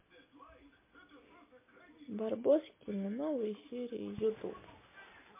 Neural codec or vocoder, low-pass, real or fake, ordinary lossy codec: none; 3.6 kHz; real; MP3, 24 kbps